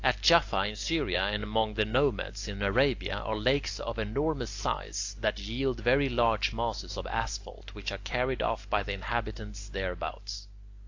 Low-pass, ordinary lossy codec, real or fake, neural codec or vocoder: 7.2 kHz; AAC, 48 kbps; real; none